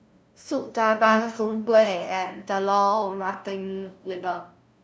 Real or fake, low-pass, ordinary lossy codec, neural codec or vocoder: fake; none; none; codec, 16 kHz, 0.5 kbps, FunCodec, trained on LibriTTS, 25 frames a second